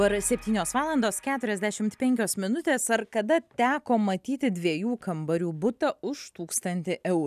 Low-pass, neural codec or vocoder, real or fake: 14.4 kHz; none; real